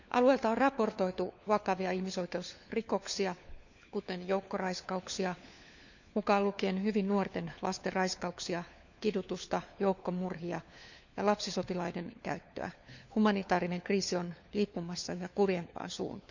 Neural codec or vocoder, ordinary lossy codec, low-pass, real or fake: codec, 16 kHz, 4 kbps, FunCodec, trained on LibriTTS, 50 frames a second; none; 7.2 kHz; fake